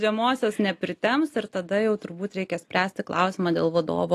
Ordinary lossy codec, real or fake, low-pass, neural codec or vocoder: AAC, 48 kbps; real; 14.4 kHz; none